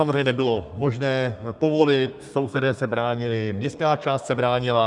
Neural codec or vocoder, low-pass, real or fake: codec, 32 kHz, 1.9 kbps, SNAC; 10.8 kHz; fake